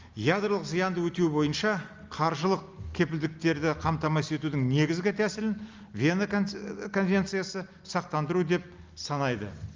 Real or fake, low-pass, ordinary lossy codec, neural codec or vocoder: real; 7.2 kHz; Opus, 32 kbps; none